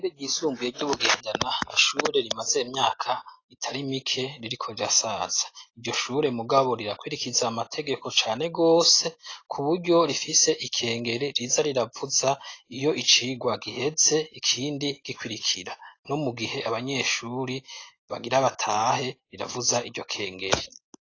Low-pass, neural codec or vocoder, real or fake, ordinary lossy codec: 7.2 kHz; none; real; AAC, 32 kbps